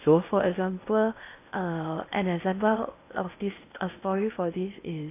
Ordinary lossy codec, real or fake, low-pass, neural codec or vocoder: AAC, 24 kbps; fake; 3.6 kHz; codec, 16 kHz in and 24 kHz out, 0.8 kbps, FocalCodec, streaming, 65536 codes